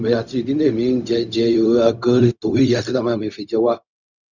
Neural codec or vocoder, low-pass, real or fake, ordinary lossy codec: codec, 16 kHz, 0.4 kbps, LongCat-Audio-Codec; 7.2 kHz; fake; none